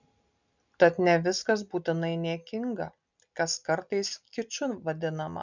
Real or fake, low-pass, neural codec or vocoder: real; 7.2 kHz; none